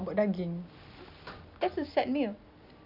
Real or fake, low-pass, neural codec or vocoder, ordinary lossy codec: real; 5.4 kHz; none; none